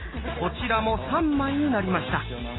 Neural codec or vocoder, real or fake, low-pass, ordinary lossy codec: none; real; 7.2 kHz; AAC, 16 kbps